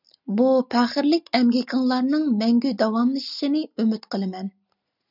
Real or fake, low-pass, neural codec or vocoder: real; 5.4 kHz; none